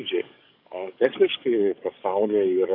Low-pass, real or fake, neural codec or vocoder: 5.4 kHz; fake; codec, 44.1 kHz, 7.8 kbps, DAC